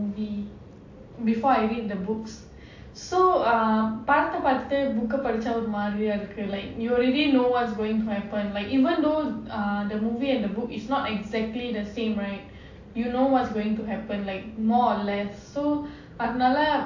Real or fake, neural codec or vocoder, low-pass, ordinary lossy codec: real; none; 7.2 kHz; AAC, 48 kbps